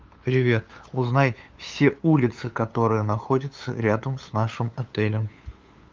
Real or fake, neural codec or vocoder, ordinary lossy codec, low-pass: fake; codec, 16 kHz, 8 kbps, FunCodec, trained on LibriTTS, 25 frames a second; Opus, 32 kbps; 7.2 kHz